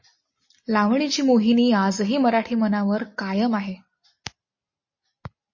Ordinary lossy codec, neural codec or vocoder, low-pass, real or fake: MP3, 32 kbps; none; 7.2 kHz; real